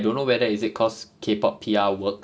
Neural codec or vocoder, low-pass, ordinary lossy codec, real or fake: none; none; none; real